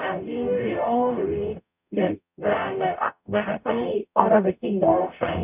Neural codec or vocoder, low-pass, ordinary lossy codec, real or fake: codec, 44.1 kHz, 0.9 kbps, DAC; 3.6 kHz; none; fake